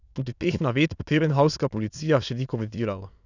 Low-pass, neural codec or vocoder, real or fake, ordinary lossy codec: 7.2 kHz; autoencoder, 22.05 kHz, a latent of 192 numbers a frame, VITS, trained on many speakers; fake; none